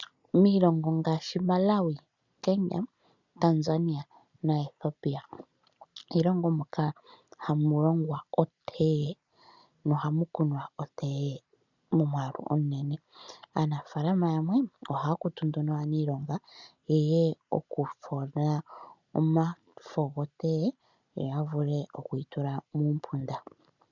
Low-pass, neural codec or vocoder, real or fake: 7.2 kHz; none; real